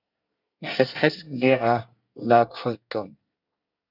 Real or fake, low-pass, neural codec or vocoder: fake; 5.4 kHz; codec, 24 kHz, 1 kbps, SNAC